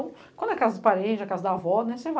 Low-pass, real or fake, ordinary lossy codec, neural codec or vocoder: none; real; none; none